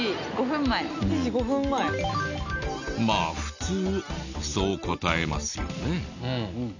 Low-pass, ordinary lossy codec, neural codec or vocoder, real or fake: 7.2 kHz; none; none; real